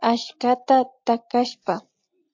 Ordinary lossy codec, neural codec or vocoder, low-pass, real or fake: MP3, 48 kbps; none; 7.2 kHz; real